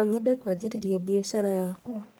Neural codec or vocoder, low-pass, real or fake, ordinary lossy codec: codec, 44.1 kHz, 1.7 kbps, Pupu-Codec; none; fake; none